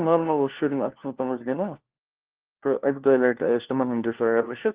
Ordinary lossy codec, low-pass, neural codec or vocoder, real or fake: Opus, 16 kbps; 3.6 kHz; codec, 16 kHz, 1 kbps, FunCodec, trained on LibriTTS, 50 frames a second; fake